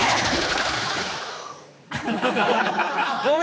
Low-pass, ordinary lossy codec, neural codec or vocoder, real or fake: none; none; codec, 16 kHz, 4 kbps, X-Codec, HuBERT features, trained on general audio; fake